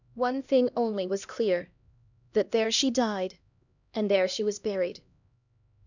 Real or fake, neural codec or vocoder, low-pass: fake; codec, 16 kHz, 1 kbps, X-Codec, HuBERT features, trained on LibriSpeech; 7.2 kHz